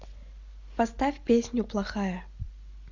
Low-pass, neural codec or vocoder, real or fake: 7.2 kHz; none; real